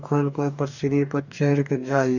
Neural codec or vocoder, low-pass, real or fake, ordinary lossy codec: codec, 44.1 kHz, 2.6 kbps, DAC; 7.2 kHz; fake; none